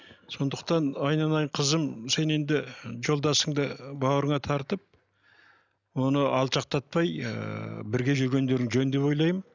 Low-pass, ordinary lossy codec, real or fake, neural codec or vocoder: 7.2 kHz; none; real; none